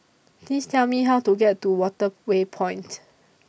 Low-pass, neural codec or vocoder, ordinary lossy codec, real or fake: none; none; none; real